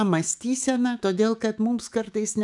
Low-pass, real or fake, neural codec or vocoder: 10.8 kHz; fake; autoencoder, 48 kHz, 128 numbers a frame, DAC-VAE, trained on Japanese speech